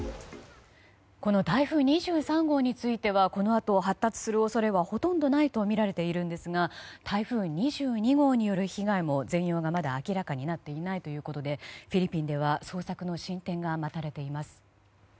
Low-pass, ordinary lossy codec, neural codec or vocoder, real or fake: none; none; none; real